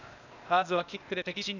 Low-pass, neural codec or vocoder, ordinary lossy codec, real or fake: 7.2 kHz; codec, 16 kHz, 0.8 kbps, ZipCodec; none; fake